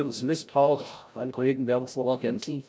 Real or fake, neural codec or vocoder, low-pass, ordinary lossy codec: fake; codec, 16 kHz, 0.5 kbps, FreqCodec, larger model; none; none